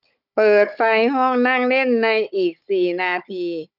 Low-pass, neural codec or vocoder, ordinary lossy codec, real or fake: 5.4 kHz; codec, 16 kHz, 16 kbps, FunCodec, trained on Chinese and English, 50 frames a second; none; fake